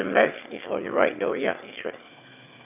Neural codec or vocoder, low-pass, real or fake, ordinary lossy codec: autoencoder, 22.05 kHz, a latent of 192 numbers a frame, VITS, trained on one speaker; 3.6 kHz; fake; none